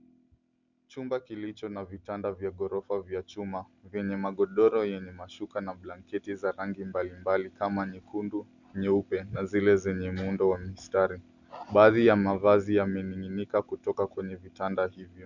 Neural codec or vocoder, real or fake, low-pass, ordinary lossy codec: none; real; 7.2 kHz; Opus, 64 kbps